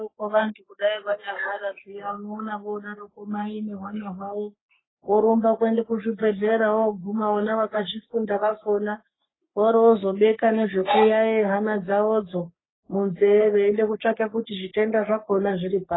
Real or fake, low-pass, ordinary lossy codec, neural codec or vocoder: fake; 7.2 kHz; AAC, 16 kbps; codec, 44.1 kHz, 3.4 kbps, Pupu-Codec